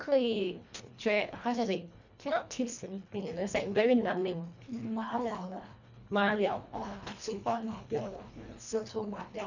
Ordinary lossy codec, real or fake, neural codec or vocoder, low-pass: none; fake; codec, 24 kHz, 1.5 kbps, HILCodec; 7.2 kHz